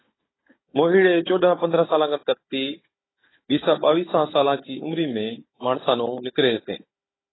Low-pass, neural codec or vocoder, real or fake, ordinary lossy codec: 7.2 kHz; codec, 16 kHz, 16 kbps, FunCodec, trained on Chinese and English, 50 frames a second; fake; AAC, 16 kbps